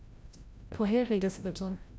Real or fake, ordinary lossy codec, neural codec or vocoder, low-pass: fake; none; codec, 16 kHz, 0.5 kbps, FreqCodec, larger model; none